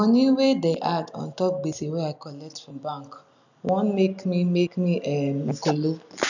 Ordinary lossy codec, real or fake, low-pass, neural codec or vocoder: none; real; 7.2 kHz; none